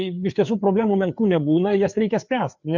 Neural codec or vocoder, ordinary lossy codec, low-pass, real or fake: codec, 16 kHz, 4 kbps, FreqCodec, larger model; MP3, 64 kbps; 7.2 kHz; fake